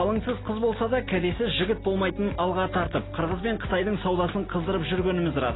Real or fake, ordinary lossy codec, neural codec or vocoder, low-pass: real; AAC, 16 kbps; none; 7.2 kHz